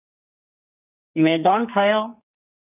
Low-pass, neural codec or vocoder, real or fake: 3.6 kHz; codec, 44.1 kHz, 2.6 kbps, SNAC; fake